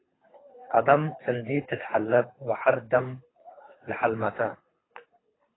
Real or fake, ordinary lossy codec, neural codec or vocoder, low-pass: fake; AAC, 16 kbps; codec, 24 kHz, 3 kbps, HILCodec; 7.2 kHz